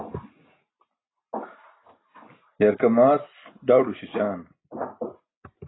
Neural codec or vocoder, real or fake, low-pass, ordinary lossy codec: none; real; 7.2 kHz; AAC, 16 kbps